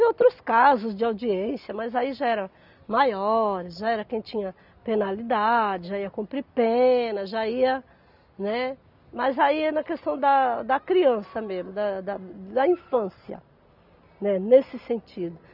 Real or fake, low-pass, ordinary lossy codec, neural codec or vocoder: real; 5.4 kHz; none; none